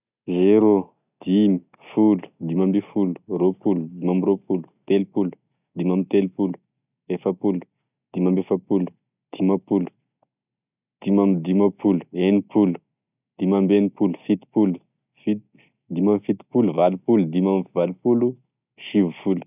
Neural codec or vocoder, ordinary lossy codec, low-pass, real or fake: none; none; 3.6 kHz; real